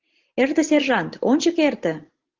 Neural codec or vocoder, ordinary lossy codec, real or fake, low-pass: none; Opus, 16 kbps; real; 7.2 kHz